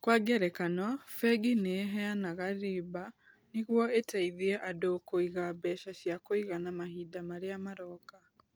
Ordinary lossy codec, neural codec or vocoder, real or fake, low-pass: none; none; real; none